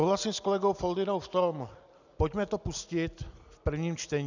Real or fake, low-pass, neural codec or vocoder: real; 7.2 kHz; none